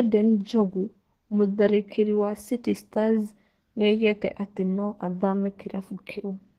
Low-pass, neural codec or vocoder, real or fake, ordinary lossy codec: 14.4 kHz; codec, 32 kHz, 1.9 kbps, SNAC; fake; Opus, 16 kbps